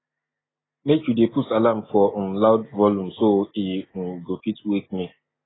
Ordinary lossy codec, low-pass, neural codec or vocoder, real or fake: AAC, 16 kbps; 7.2 kHz; none; real